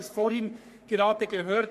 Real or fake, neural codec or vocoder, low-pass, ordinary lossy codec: fake; codec, 44.1 kHz, 3.4 kbps, Pupu-Codec; 14.4 kHz; MP3, 64 kbps